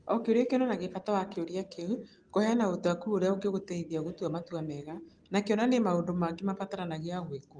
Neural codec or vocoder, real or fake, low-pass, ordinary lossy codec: none; real; 9.9 kHz; Opus, 24 kbps